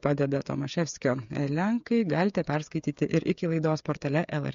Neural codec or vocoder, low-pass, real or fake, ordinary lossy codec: codec, 16 kHz, 8 kbps, FreqCodec, smaller model; 7.2 kHz; fake; MP3, 48 kbps